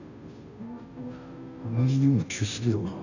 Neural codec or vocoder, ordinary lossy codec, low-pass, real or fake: codec, 16 kHz, 0.5 kbps, FunCodec, trained on Chinese and English, 25 frames a second; none; 7.2 kHz; fake